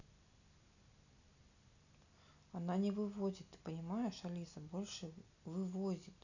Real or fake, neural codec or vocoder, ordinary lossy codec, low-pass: real; none; none; 7.2 kHz